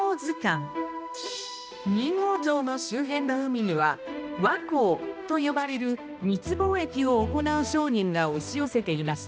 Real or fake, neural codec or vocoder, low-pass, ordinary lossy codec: fake; codec, 16 kHz, 1 kbps, X-Codec, HuBERT features, trained on balanced general audio; none; none